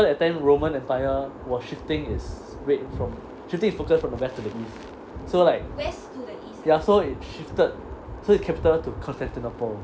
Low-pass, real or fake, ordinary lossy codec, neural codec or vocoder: none; real; none; none